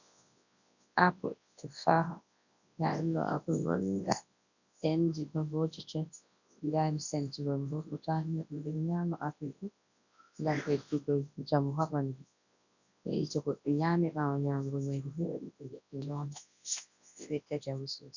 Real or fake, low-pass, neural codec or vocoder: fake; 7.2 kHz; codec, 24 kHz, 0.9 kbps, WavTokenizer, large speech release